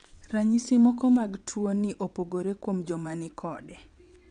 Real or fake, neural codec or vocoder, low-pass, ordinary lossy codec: fake; vocoder, 22.05 kHz, 80 mel bands, WaveNeXt; 9.9 kHz; none